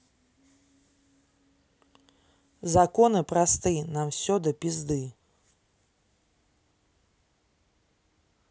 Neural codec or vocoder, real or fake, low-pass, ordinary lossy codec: none; real; none; none